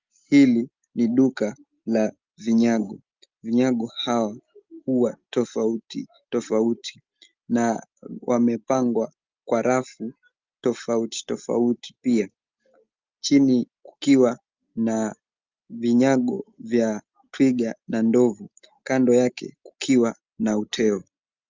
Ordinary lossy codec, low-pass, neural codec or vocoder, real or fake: Opus, 32 kbps; 7.2 kHz; none; real